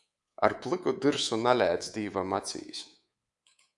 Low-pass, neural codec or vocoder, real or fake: 10.8 kHz; codec, 24 kHz, 3.1 kbps, DualCodec; fake